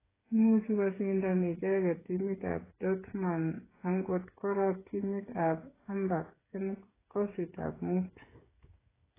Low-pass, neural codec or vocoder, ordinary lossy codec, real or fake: 3.6 kHz; codec, 16 kHz, 8 kbps, FreqCodec, smaller model; AAC, 16 kbps; fake